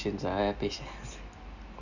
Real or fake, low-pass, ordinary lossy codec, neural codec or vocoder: real; 7.2 kHz; none; none